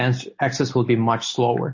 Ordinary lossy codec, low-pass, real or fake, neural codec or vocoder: MP3, 32 kbps; 7.2 kHz; real; none